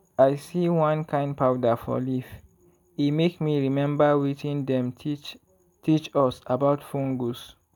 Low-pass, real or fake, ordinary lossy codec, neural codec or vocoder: 19.8 kHz; real; none; none